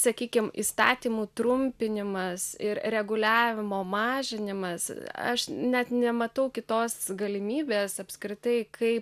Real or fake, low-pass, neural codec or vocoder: real; 14.4 kHz; none